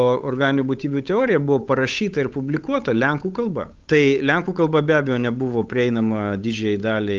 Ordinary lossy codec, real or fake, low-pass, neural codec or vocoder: Opus, 24 kbps; fake; 7.2 kHz; codec, 16 kHz, 8 kbps, FunCodec, trained on Chinese and English, 25 frames a second